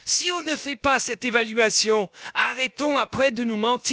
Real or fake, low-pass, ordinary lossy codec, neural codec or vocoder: fake; none; none; codec, 16 kHz, about 1 kbps, DyCAST, with the encoder's durations